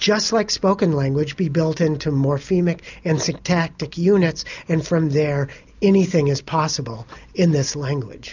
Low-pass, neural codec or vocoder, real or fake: 7.2 kHz; none; real